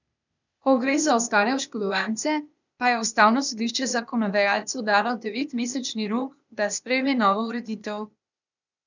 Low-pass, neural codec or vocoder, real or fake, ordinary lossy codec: 7.2 kHz; codec, 16 kHz, 0.8 kbps, ZipCodec; fake; none